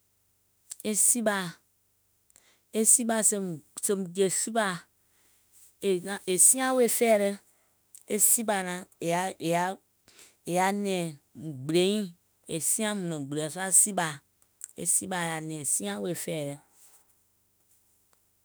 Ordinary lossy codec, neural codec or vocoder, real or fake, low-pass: none; autoencoder, 48 kHz, 32 numbers a frame, DAC-VAE, trained on Japanese speech; fake; none